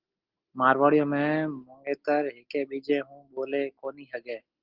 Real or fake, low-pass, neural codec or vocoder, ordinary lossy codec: real; 5.4 kHz; none; Opus, 16 kbps